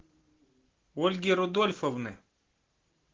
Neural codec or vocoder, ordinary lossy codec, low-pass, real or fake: none; Opus, 16 kbps; 7.2 kHz; real